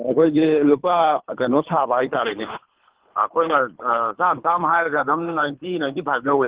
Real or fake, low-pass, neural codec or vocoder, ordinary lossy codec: fake; 3.6 kHz; codec, 24 kHz, 3 kbps, HILCodec; Opus, 16 kbps